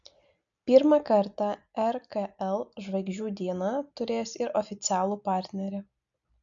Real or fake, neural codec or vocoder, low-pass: real; none; 7.2 kHz